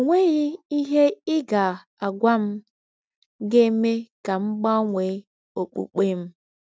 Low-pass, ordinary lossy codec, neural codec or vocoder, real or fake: none; none; none; real